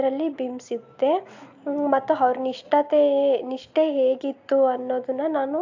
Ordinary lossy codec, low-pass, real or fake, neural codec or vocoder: AAC, 48 kbps; 7.2 kHz; real; none